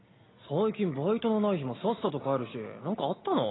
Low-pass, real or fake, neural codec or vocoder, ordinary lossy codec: 7.2 kHz; real; none; AAC, 16 kbps